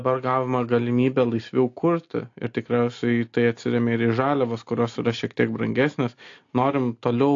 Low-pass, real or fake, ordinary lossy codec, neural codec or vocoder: 7.2 kHz; real; AAC, 48 kbps; none